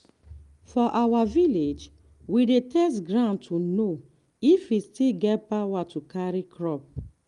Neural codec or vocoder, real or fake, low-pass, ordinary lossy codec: none; real; 10.8 kHz; Opus, 32 kbps